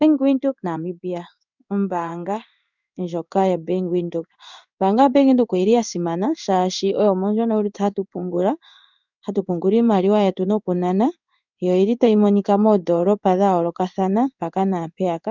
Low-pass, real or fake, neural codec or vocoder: 7.2 kHz; fake; codec, 16 kHz in and 24 kHz out, 1 kbps, XY-Tokenizer